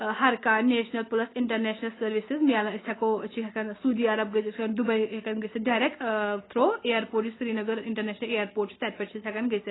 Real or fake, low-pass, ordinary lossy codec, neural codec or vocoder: real; 7.2 kHz; AAC, 16 kbps; none